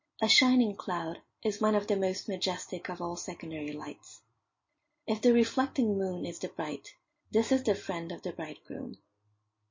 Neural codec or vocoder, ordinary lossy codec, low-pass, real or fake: none; MP3, 32 kbps; 7.2 kHz; real